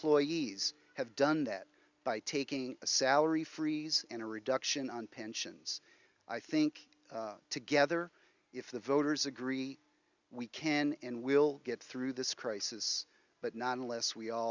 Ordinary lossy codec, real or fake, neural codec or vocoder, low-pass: Opus, 64 kbps; real; none; 7.2 kHz